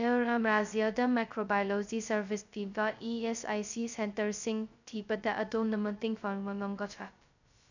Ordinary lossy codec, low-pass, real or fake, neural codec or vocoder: none; 7.2 kHz; fake; codec, 16 kHz, 0.2 kbps, FocalCodec